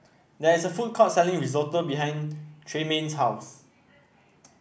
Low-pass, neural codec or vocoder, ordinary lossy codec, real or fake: none; none; none; real